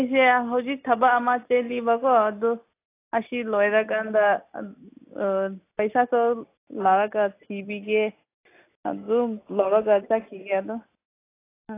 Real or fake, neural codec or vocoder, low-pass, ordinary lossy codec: real; none; 3.6 kHz; AAC, 24 kbps